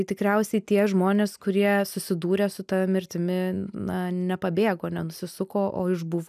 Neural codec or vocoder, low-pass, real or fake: none; 14.4 kHz; real